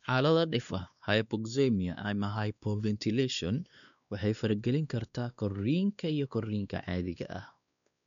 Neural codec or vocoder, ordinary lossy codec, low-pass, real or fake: codec, 16 kHz, 2 kbps, X-Codec, WavLM features, trained on Multilingual LibriSpeech; none; 7.2 kHz; fake